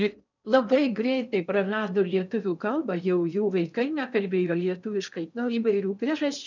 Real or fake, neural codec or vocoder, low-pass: fake; codec, 16 kHz in and 24 kHz out, 0.6 kbps, FocalCodec, streaming, 4096 codes; 7.2 kHz